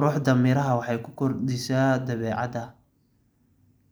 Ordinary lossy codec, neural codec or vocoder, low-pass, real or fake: none; none; none; real